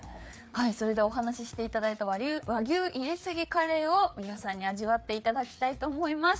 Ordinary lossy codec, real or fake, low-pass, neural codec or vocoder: none; fake; none; codec, 16 kHz, 4 kbps, FreqCodec, larger model